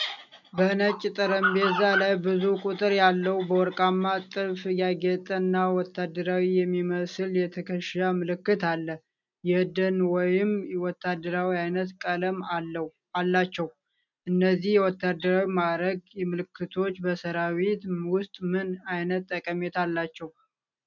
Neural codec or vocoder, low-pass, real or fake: none; 7.2 kHz; real